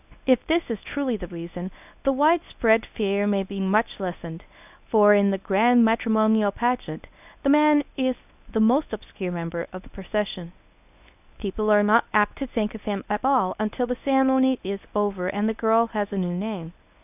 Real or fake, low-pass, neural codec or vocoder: fake; 3.6 kHz; codec, 24 kHz, 0.9 kbps, WavTokenizer, medium speech release version 1